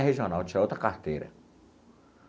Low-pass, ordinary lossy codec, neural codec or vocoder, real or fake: none; none; none; real